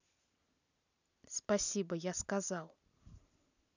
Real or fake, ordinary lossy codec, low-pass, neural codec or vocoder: real; none; 7.2 kHz; none